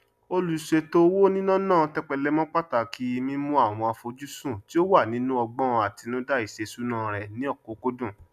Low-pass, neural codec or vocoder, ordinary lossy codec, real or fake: 14.4 kHz; none; none; real